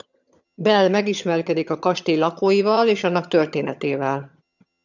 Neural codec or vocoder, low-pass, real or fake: vocoder, 22.05 kHz, 80 mel bands, HiFi-GAN; 7.2 kHz; fake